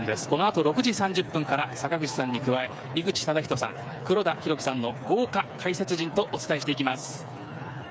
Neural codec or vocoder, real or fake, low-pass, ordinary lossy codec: codec, 16 kHz, 4 kbps, FreqCodec, smaller model; fake; none; none